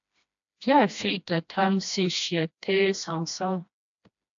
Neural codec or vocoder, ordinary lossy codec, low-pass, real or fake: codec, 16 kHz, 1 kbps, FreqCodec, smaller model; AAC, 64 kbps; 7.2 kHz; fake